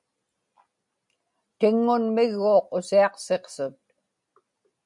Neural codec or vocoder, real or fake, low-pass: none; real; 10.8 kHz